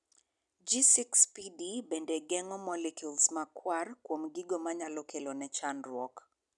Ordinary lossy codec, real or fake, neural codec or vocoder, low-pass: none; real; none; 10.8 kHz